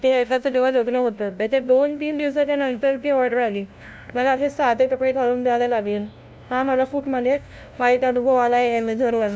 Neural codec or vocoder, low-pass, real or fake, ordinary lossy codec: codec, 16 kHz, 0.5 kbps, FunCodec, trained on LibriTTS, 25 frames a second; none; fake; none